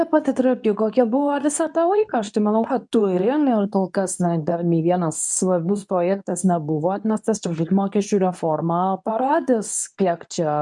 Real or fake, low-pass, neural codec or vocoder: fake; 10.8 kHz; codec, 24 kHz, 0.9 kbps, WavTokenizer, medium speech release version 2